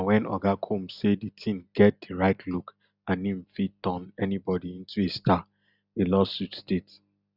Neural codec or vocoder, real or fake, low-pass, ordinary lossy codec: none; real; 5.4 kHz; none